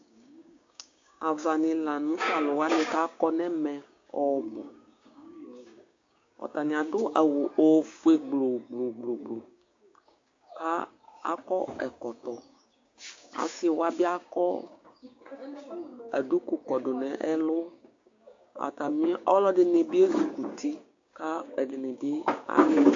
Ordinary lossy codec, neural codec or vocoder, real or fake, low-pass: AAC, 48 kbps; codec, 16 kHz, 6 kbps, DAC; fake; 7.2 kHz